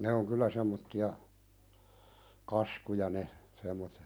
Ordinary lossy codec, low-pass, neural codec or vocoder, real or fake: none; none; none; real